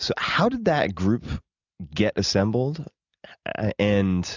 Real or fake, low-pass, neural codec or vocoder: real; 7.2 kHz; none